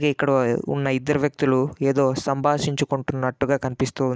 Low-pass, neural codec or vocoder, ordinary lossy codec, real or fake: none; none; none; real